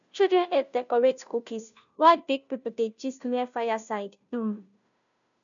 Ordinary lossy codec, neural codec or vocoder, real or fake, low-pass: none; codec, 16 kHz, 0.5 kbps, FunCodec, trained on Chinese and English, 25 frames a second; fake; 7.2 kHz